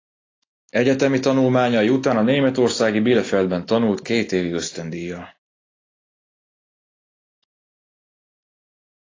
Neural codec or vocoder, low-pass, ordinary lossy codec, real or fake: none; 7.2 kHz; AAC, 32 kbps; real